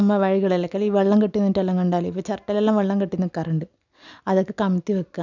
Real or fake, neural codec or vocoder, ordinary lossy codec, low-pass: real; none; none; 7.2 kHz